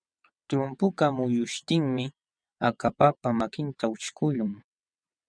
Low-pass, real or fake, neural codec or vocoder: 9.9 kHz; fake; vocoder, 22.05 kHz, 80 mel bands, WaveNeXt